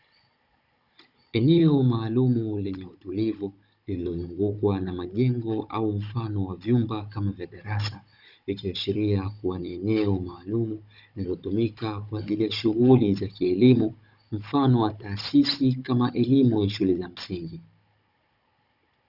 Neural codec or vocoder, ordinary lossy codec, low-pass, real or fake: codec, 16 kHz, 16 kbps, FunCodec, trained on Chinese and English, 50 frames a second; Opus, 64 kbps; 5.4 kHz; fake